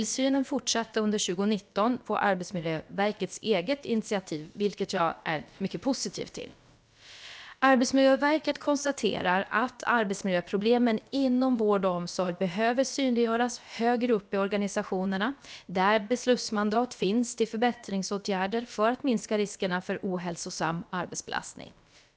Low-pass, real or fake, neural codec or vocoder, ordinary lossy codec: none; fake; codec, 16 kHz, about 1 kbps, DyCAST, with the encoder's durations; none